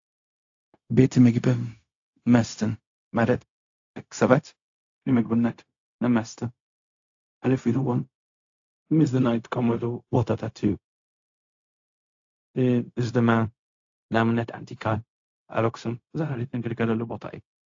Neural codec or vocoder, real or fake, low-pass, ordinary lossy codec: codec, 16 kHz, 0.4 kbps, LongCat-Audio-Codec; fake; 7.2 kHz; AAC, 48 kbps